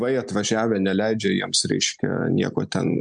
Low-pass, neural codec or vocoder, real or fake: 9.9 kHz; none; real